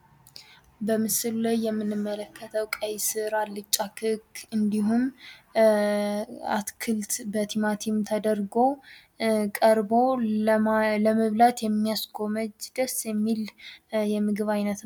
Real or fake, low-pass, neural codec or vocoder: real; 19.8 kHz; none